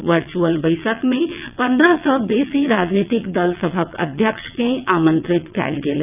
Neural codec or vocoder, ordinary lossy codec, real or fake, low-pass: vocoder, 22.05 kHz, 80 mel bands, WaveNeXt; none; fake; 3.6 kHz